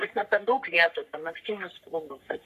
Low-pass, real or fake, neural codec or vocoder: 14.4 kHz; fake; codec, 44.1 kHz, 3.4 kbps, Pupu-Codec